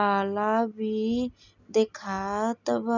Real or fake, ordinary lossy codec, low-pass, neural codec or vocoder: real; none; 7.2 kHz; none